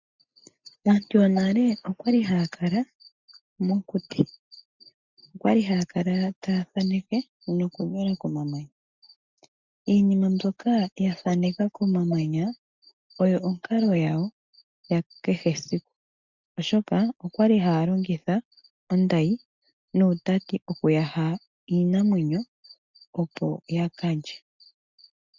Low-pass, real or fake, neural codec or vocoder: 7.2 kHz; real; none